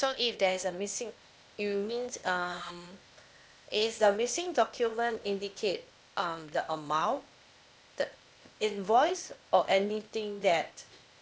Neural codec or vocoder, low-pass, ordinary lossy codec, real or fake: codec, 16 kHz, 0.8 kbps, ZipCodec; none; none; fake